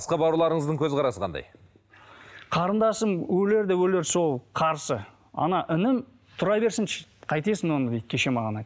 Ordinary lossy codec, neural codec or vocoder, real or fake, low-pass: none; none; real; none